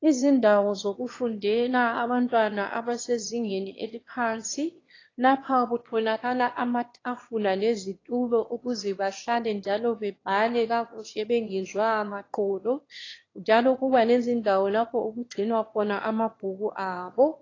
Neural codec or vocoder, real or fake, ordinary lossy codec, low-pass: autoencoder, 22.05 kHz, a latent of 192 numbers a frame, VITS, trained on one speaker; fake; AAC, 32 kbps; 7.2 kHz